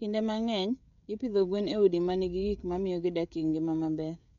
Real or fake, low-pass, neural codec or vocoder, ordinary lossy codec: fake; 7.2 kHz; codec, 16 kHz, 16 kbps, FreqCodec, smaller model; none